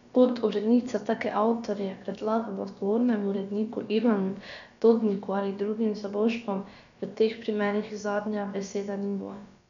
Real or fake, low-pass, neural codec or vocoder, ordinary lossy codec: fake; 7.2 kHz; codec, 16 kHz, about 1 kbps, DyCAST, with the encoder's durations; none